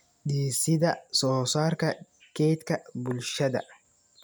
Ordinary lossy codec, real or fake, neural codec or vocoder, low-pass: none; real; none; none